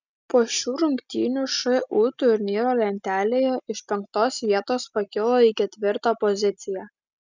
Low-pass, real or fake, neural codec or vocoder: 7.2 kHz; real; none